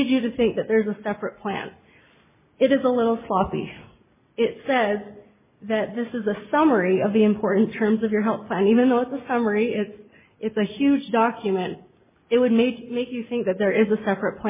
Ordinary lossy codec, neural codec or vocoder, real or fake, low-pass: MP3, 16 kbps; none; real; 3.6 kHz